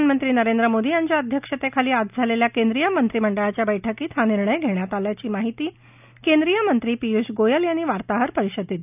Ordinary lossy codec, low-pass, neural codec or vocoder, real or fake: none; 3.6 kHz; none; real